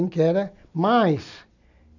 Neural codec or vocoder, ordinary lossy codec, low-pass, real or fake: none; none; 7.2 kHz; real